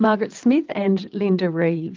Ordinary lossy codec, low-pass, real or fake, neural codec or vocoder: Opus, 32 kbps; 7.2 kHz; fake; vocoder, 22.05 kHz, 80 mel bands, WaveNeXt